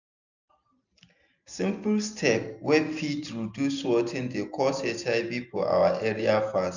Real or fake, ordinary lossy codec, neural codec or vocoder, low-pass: real; none; none; 7.2 kHz